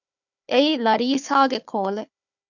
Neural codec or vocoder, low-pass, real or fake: codec, 16 kHz, 4 kbps, FunCodec, trained on Chinese and English, 50 frames a second; 7.2 kHz; fake